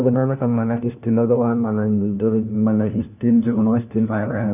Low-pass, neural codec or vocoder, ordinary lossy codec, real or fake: 3.6 kHz; codec, 16 kHz, 1 kbps, FunCodec, trained on LibriTTS, 50 frames a second; none; fake